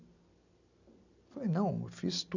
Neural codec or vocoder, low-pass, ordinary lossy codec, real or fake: none; 7.2 kHz; none; real